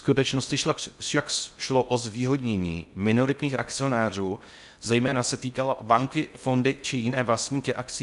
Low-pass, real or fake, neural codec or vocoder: 10.8 kHz; fake; codec, 16 kHz in and 24 kHz out, 0.6 kbps, FocalCodec, streaming, 2048 codes